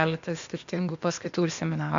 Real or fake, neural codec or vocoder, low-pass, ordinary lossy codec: fake; codec, 16 kHz, 0.8 kbps, ZipCodec; 7.2 kHz; MP3, 64 kbps